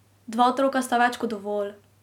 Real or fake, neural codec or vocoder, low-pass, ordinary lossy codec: real; none; 19.8 kHz; none